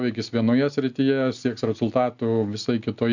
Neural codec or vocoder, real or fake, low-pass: none; real; 7.2 kHz